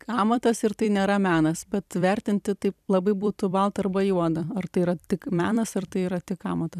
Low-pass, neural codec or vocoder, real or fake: 14.4 kHz; vocoder, 44.1 kHz, 128 mel bands every 256 samples, BigVGAN v2; fake